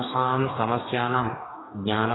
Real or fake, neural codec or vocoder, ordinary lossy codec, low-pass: fake; codec, 44.1 kHz, 2.6 kbps, DAC; AAC, 16 kbps; 7.2 kHz